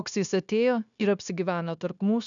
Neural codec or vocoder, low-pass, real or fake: codec, 16 kHz, 0.9 kbps, LongCat-Audio-Codec; 7.2 kHz; fake